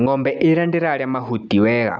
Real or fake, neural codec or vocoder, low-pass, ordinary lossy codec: real; none; none; none